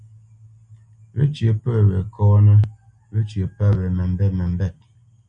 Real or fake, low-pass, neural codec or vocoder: real; 9.9 kHz; none